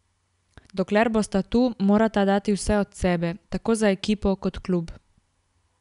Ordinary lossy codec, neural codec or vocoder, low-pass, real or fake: none; none; 10.8 kHz; real